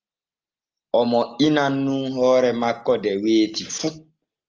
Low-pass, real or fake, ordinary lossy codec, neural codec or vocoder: 7.2 kHz; real; Opus, 24 kbps; none